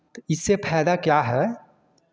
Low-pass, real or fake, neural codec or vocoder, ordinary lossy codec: none; real; none; none